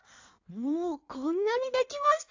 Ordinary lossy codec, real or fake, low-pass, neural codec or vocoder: AAC, 48 kbps; fake; 7.2 kHz; codec, 16 kHz in and 24 kHz out, 1.1 kbps, FireRedTTS-2 codec